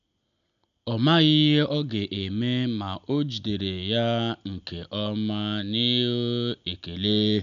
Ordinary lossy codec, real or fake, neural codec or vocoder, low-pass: none; real; none; 7.2 kHz